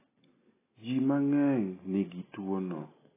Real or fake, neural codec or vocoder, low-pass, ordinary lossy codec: real; none; 3.6 kHz; AAC, 16 kbps